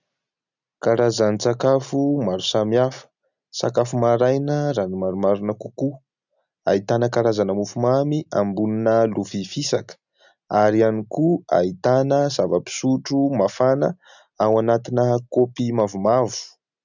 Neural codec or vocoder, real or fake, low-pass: none; real; 7.2 kHz